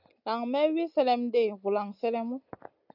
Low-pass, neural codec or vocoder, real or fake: 5.4 kHz; none; real